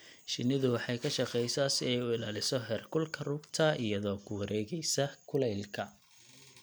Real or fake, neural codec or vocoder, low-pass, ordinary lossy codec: real; none; none; none